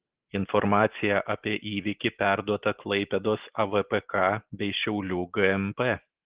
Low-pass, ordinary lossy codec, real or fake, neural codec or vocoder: 3.6 kHz; Opus, 16 kbps; real; none